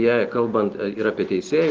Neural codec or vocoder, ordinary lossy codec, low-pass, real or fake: none; Opus, 16 kbps; 7.2 kHz; real